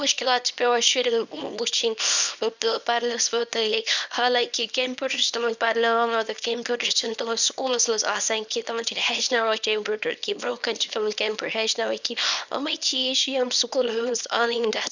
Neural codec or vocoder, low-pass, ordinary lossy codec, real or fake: codec, 24 kHz, 0.9 kbps, WavTokenizer, small release; 7.2 kHz; none; fake